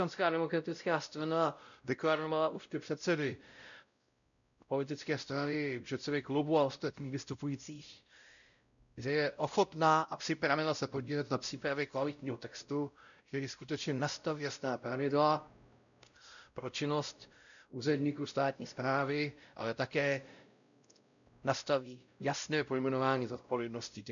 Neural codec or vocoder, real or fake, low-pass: codec, 16 kHz, 0.5 kbps, X-Codec, WavLM features, trained on Multilingual LibriSpeech; fake; 7.2 kHz